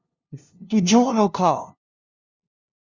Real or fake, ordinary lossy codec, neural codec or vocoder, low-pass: fake; Opus, 64 kbps; codec, 16 kHz, 0.5 kbps, FunCodec, trained on LibriTTS, 25 frames a second; 7.2 kHz